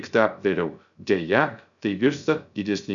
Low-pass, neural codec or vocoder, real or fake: 7.2 kHz; codec, 16 kHz, 0.3 kbps, FocalCodec; fake